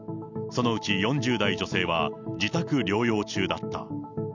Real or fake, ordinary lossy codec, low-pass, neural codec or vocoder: real; none; 7.2 kHz; none